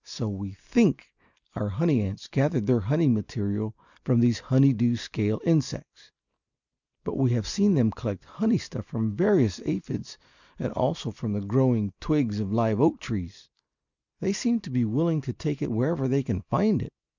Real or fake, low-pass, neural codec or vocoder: real; 7.2 kHz; none